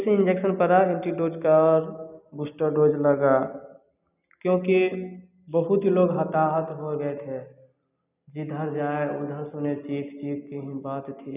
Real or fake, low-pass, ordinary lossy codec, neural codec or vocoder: real; 3.6 kHz; none; none